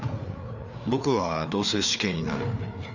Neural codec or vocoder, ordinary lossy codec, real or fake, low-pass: codec, 16 kHz, 4 kbps, FreqCodec, larger model; none; fake; 7.2 kHz